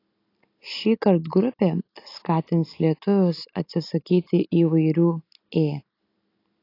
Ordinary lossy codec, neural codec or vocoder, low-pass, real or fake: AAC, 32 kbps; none; 5.4 kHz; real